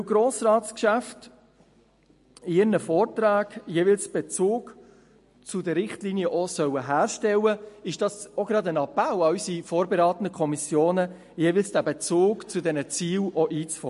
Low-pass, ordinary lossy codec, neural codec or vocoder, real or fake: 14.4 kHz; MP3, 48 kbps; none; real